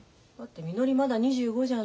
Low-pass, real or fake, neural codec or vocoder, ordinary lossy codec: none; real; none; none